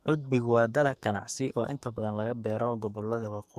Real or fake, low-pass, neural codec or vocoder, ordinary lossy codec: fake; 14.4 kHz; codec, 44.1 kHz, 2.6 kbps, SNAC; MP3, 96 kbps